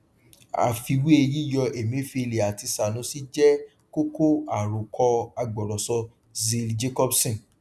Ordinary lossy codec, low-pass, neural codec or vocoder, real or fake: none; none; none; real